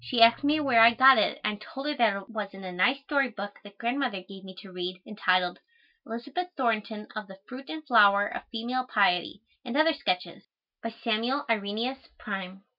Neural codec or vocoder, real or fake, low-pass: none; real; 5.4 kHz